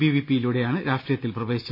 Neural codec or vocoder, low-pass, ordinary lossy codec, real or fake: none; 5.4 kHz; MP3, 24 kbps; real